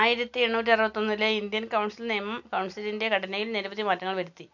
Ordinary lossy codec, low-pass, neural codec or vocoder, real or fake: none; 7.2 kHz; none; real